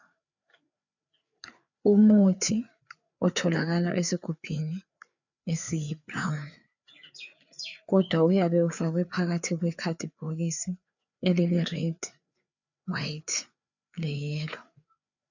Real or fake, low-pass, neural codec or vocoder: fake; 7.2 kHz; codec, 16 kHz, 4 kbps, FreqCodec, larger model